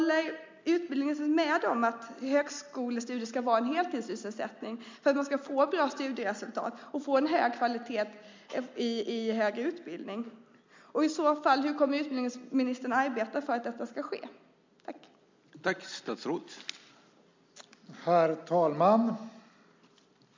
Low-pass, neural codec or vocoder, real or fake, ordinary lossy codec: 7.2 kHz; none; real; MP3, 64 kbps